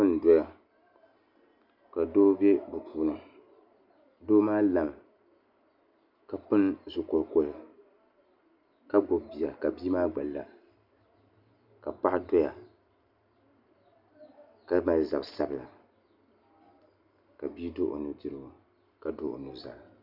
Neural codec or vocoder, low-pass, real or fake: none; 5.4 kHz; real